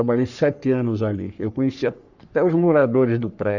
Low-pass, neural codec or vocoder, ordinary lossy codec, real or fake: 7.2 kHz; codec, 44.1 kHz, 3.4 kbps, Pupu-Codec; none; fake